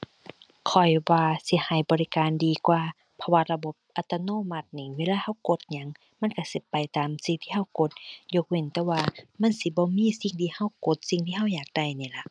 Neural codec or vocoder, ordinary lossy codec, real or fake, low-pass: none; none; real; 9.9 kHz